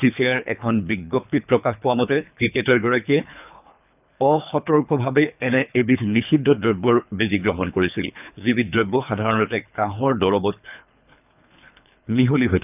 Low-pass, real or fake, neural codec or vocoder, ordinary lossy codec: 3.6 kHz; fake; codec, 24 kHz, 3 kbps, HILCodec; none